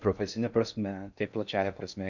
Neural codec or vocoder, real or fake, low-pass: codec, 16 kHz in and 24 kHz out, 0.6 kbps, FocalCodec, streaming, 4096 codes; fake; 7.2 kHz